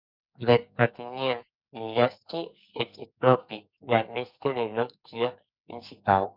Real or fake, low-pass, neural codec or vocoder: fake; 5.4 kHz; codec, 44.1 kHz, 2.6 kbps, SNAC